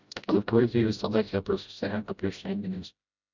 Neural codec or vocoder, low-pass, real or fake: codec, 16 kHz, 0.5 kbps, FreqCodec, smaller model; 7.2 kHz; fake